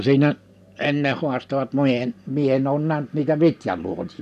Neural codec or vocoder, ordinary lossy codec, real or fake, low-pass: none; none; real; 14.4 kHz